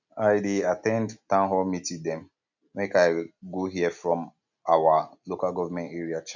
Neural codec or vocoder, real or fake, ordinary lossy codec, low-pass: none; real; none; 7.2 kHz